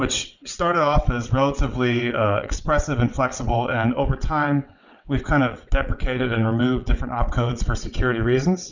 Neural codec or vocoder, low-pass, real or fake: vocoder, 22.05 kHz, 80 mel bands, WaveNeXt; 7.2 kHz; fake